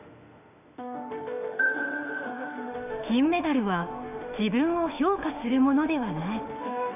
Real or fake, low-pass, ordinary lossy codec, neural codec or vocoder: fake; 3.6 kHz; none; autoencoder, 48 kHz, 32 numbers a frame, DAC-VAE, trained on Japanese speech